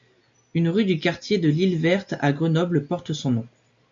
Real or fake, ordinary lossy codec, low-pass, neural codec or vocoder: real; MP3, 48 kbps; 7.2 kHz; none